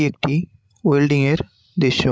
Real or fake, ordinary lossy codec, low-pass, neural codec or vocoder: fake; none; none; codec, 16 kHz, 16 kbps, FreqCodec, larger model